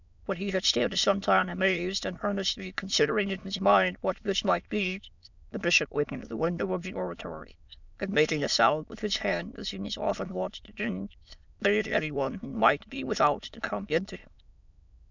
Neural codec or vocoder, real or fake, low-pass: autoencoder, 22.05 kHz, a latent of 192 numbers a frame, VITS, trained on many speakers; fake; 7.2 kHz